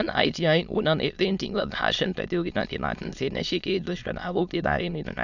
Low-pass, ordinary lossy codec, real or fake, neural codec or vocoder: 7.2 kHz; none; fake; autoencoder, 22.05 kHz, a latent of 192 numbers a frame, VITS, trained on many speakers